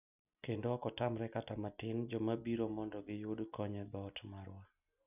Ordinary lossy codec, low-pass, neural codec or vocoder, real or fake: none; 3.6 kHz; none; real